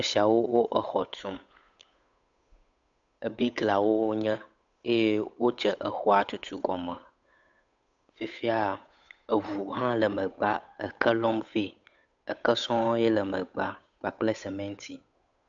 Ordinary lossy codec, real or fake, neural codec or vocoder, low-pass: AAC, 64 kbps; fake; codec, 16 kHz, 8 kbps, FunCodec, trained on Chinese and English, 25 frames a second; 7.2 kHz